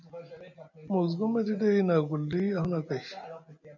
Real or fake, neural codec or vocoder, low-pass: real; none; 7.2 kHz